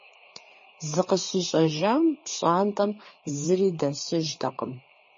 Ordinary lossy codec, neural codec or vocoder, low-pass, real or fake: MP3, 32 kbps; codec, 16 kHz, 4 kbps, FreqCodec, larger model; 7.2 kHz; fake